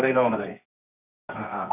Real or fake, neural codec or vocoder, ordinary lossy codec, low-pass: fake; codec, 24 kHz, 0.9 kbps, WavTokenizer, medium music audio release; none; 3.6 kHz